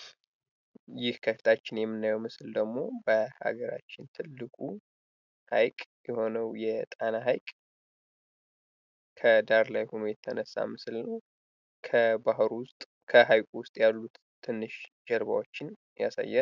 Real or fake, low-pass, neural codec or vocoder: real; 7.2 kHz; none